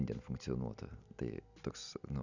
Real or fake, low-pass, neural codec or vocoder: real; 7.2 kHz; none